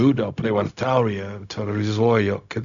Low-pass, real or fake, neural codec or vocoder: 7.2 kHz; fake; codec, 16 kHz, 0.4 kbps, LongCat-Audio-Codec